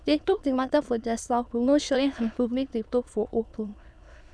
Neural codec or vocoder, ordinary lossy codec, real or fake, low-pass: autoencoder, 22.05 kHz, a latent of 192 numbers a frame, VITS, trained on many speakers; none; fake; none